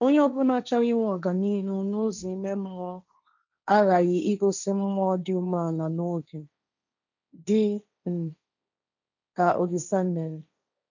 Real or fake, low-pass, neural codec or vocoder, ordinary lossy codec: fake; 7.2 kHz; codec, 16 kHz, 1.1 kbps, Voila-Tokenizer; none